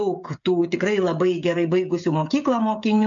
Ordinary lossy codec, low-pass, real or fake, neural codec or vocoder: MP3, 64 kbps; 7.2 kHz; fake; codec, 16 kHz, 16 kbps, FreqCodec, smaller model